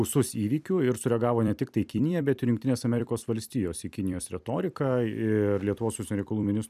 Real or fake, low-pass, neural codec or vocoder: fake; 14.4 kHz; vocoder, 44.1 kHz, 128 mel bands every 256 samples, BigVGAN v2